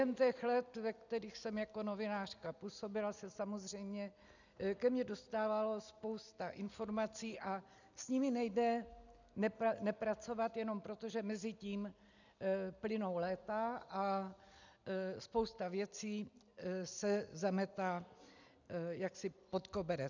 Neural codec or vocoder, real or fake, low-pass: none; real; 7.2 kHz